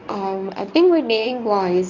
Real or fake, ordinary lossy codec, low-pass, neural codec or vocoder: fake; none; 7.2 kHz; codec, 24 kHz, 0.9 kbps, WavTokenizer, medium speech release version 1